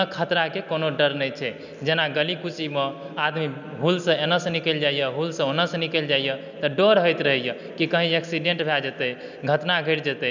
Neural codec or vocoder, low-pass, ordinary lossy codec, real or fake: none; 7.2 kHz; none; real